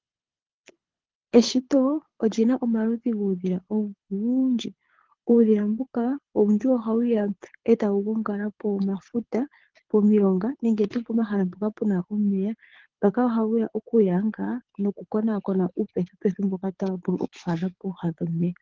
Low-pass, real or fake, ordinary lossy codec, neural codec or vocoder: 7.2 kHz; fake; Opus, 16 kbps; codec, 24 kHz, 6 kbps, HILCodec